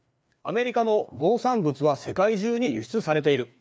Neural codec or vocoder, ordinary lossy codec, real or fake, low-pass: codec, 16 kHz, 2 kbps, FreqCodec, larger model; none; fake; none